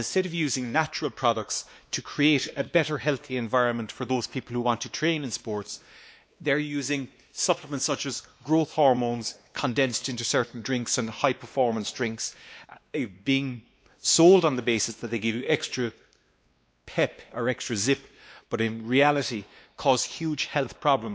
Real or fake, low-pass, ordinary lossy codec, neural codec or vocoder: fake; none; none; codec, 16 kHz, 2 kbps, X-Codec, WavLM features, trained on Multilingual LibriSpeech